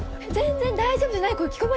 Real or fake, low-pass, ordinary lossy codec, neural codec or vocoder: real; none; none; none